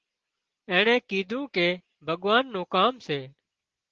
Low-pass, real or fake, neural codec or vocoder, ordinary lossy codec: 7.2 kHz; real; none; Opus, 16 kbps